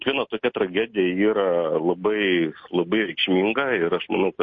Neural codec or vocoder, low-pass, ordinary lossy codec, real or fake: none; 7.2 kHz; MP3, 32 kbps; real